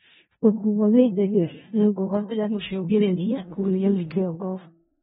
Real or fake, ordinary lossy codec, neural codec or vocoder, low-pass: fake; AAC, 16 kbps; codec, 16 kHz in and 24 kHz out, 0.4 kbps, LongCat-Audio-Codec, four codebook decoder; 10.8 kHz